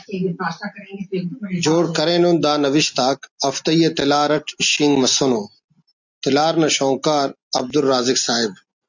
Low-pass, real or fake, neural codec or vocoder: 7.2 kHz; real; none